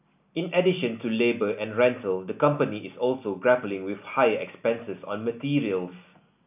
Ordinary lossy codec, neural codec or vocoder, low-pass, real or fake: none; none; 3.6 kHz; real